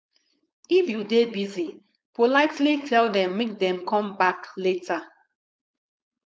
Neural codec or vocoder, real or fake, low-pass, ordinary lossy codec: codec, 16 kHz, 4.8 kbps, FACodec; fake; none; none